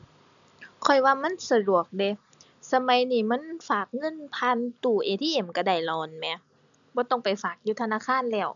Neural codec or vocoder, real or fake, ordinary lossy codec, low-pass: none; real; none; 7.2 kHz